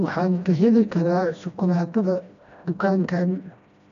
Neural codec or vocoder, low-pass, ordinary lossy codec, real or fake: codec, 16 kHz, 1 kbps, FreqCodec, smaller model; 7.2 kHz; none; fake